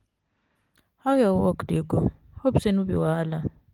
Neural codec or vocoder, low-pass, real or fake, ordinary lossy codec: none; 19.8 kHz; real; Opus, 24 kbps